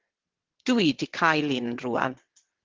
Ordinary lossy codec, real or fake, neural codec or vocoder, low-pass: Opus, 16 kbps; fake; vocoder, 22.05 kHz, 80 mel bands, WaveNeXt; 7.2 kHz